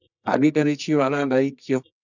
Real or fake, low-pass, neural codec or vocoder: fake; 7.2 kHz; codec, 24 kHz, 0.9 kbps, WavTokenizer, medium music audio release